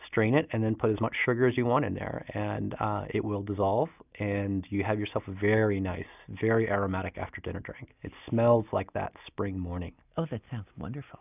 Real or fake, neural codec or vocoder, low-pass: real; none; 3.6 kHz